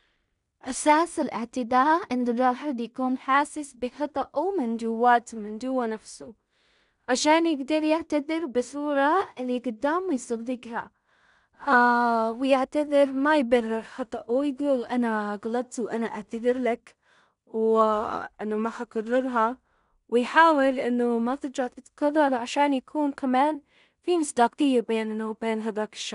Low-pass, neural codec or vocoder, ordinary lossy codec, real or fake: 10.8 kHz; codec, 16 kHz in and 24 kHz out, 0.4 kbps, LongCat-Audio-Codec, two codebook decoder; none; fake